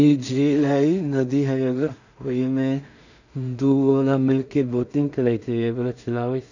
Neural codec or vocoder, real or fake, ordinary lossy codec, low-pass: codec, 16 kHz in and 24 kHz out, 0.4 kbps, LongCat-Audio-Codec, two codebook decoder; fake; none; 7.2 kHz